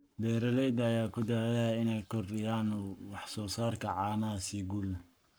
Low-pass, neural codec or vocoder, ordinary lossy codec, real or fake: none; codec, 44.1 kHz, 7.8 kbps, Pupu-Codec; none; fake